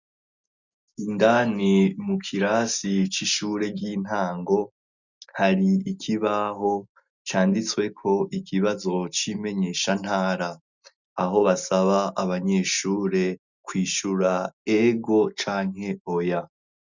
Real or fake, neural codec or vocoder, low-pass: real; none; 7.2 kHz